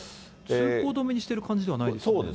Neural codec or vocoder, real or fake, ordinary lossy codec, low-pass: none; real; none; none